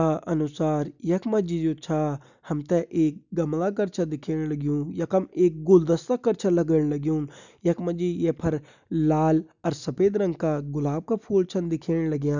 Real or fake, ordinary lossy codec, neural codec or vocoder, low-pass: real; none; none; 7.2 kHz